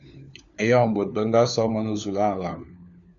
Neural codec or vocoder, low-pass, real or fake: codec, 16 kHz, 4 kbps, FunCodec, trained on LibriTTS, 50 frames a second; 7.2 kHz; fake